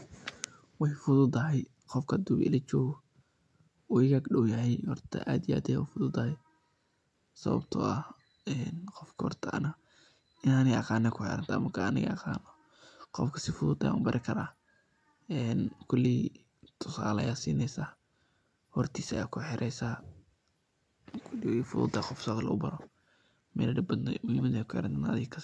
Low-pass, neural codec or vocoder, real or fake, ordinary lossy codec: none; none; real; none